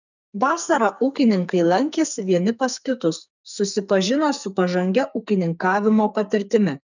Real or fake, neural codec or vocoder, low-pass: fake; codec, 44.1 kHz, 2.6 kbps, SNAC; 7.2 kHz